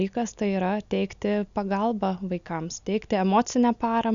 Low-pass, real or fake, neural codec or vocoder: 7.2 kHz; real; none